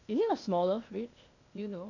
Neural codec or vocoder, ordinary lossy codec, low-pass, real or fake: codec, 16 kHz, 0.8 kbps, ZipCodec; none; 7.2 kHz; fake